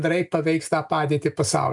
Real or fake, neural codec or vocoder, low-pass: real; none; 10.8 kHz